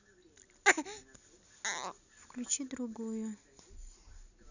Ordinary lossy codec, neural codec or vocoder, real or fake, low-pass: none; none; real; 7.2 kHz